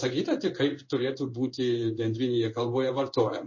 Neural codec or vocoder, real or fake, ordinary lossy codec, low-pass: codec, 16 kHz in and 24 kHz out, 1 kbps, XY-Tokenizer; fake; MP3, 32 kbps; 7.2 kHz